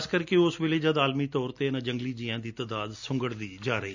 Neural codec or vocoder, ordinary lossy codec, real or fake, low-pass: none; none; real; 7.2 kHz